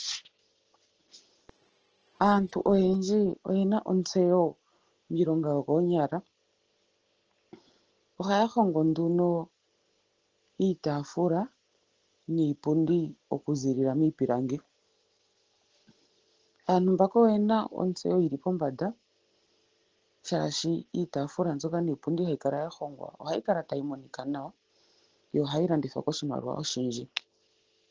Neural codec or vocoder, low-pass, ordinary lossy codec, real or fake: none; 7.2 kHz; Opus, 16 kbps; real